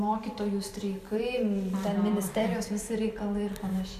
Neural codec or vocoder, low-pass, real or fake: vocoder, 44.1 kHz, 128 mel bands every 512 samples, BigVGAN v2; 14.4 kHz; fake